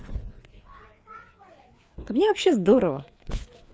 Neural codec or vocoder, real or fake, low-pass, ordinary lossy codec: codec, 16 kHz, 4 kbps, FreqCodec, larger model; fake; none; none